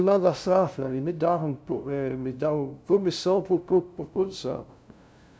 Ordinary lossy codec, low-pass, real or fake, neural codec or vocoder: none; none; fake; codec, 16 kHz, 0.5 kbps, FunCodec, trained on LibriTTS, 25 frames a second